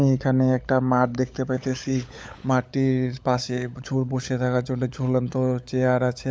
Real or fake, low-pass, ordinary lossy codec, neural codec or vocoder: fake; none; none; codec, 16 kHz, 16 kbps, FunCodec, trained on LibriTTS, 50 frames a second